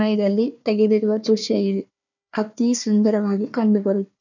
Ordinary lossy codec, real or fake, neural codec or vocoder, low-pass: none; fake; codec, 16 kHz, 1 kbps, FunCodec, trained on Chinese and English, 50 frames a second; 7.2 kHz